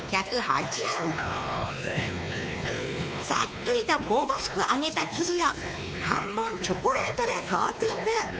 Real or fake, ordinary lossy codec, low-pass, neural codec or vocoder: fake; none; none; codec, 16 kHz, 2 kbps, X-Codec, WavLM features, trained on Multilingual LibriSpeech